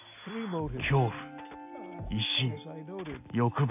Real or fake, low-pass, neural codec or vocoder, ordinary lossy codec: real; 3.6 kHz; none; AAC, 32 kbps